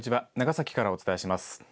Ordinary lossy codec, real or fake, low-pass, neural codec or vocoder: none; real; none; none